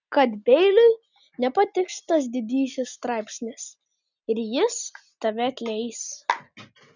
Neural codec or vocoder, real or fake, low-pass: none; real; 7.2 kHz